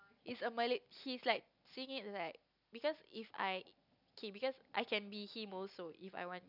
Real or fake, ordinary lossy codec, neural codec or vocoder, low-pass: real; none; none; 5.4 kHz